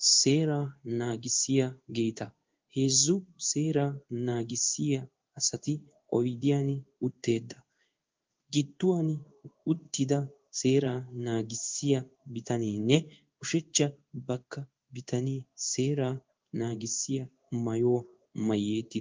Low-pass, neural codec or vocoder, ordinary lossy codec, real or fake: 7.2 kHz; codec, 16 kHz in and 24 kHz out, 1 kbps, XY-Tokenizer; Opus, 32 kbps; fake